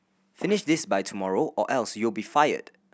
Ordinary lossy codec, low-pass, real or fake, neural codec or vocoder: none; none; real; none